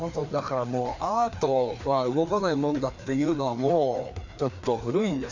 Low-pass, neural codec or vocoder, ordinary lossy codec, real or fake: 7.2 kHz; codec, 16 kHz, 2 kbps, FreqCodec, larger model; none; fake